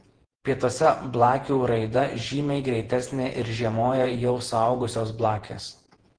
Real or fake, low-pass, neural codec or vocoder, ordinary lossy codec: fake; 9.9 kHz; vocoder, 48 kHz, 128 mel bands, Vocos; Opus, 16 kbps